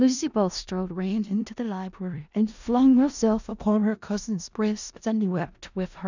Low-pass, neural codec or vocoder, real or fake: 7.2 kHz; codec, 16 kHz in and 24 kHz out, 0.4 kbps, LongCat-Audio-Codec, four codebook decoder; fake